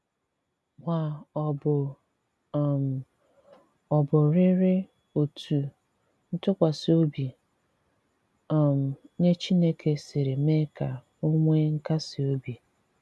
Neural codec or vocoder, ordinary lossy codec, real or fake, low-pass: none; none; real; none